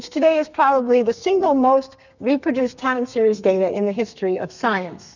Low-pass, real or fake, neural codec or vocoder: 7.2 kHz; fake; codec, 32 kHz, 1.9 kbps, SNAC